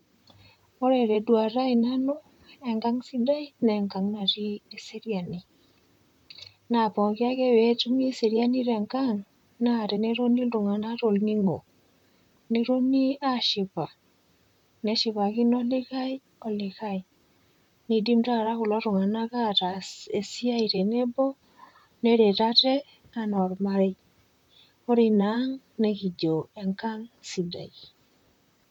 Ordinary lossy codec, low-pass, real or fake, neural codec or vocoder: none; 19.8 kHz; fake; vocoder, 44.1 kHz, 128 mel bands, Pupu-Vocoder